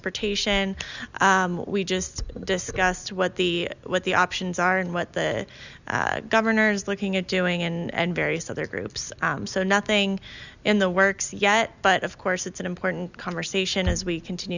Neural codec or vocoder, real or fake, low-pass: none; real; 7.2 kHz